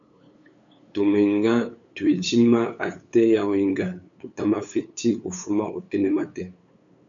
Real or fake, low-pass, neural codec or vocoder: fake; 7.2 kHz; codec, 16 kHz, 8 kbps, FunCodec, trained on LibriTTS, 25 frames a second